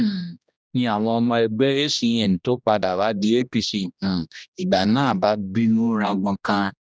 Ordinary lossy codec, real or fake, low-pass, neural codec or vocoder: none; fake; none; codec, 16 kHz, 1 kbps, X-Codec, HuBERT features, trained on general audio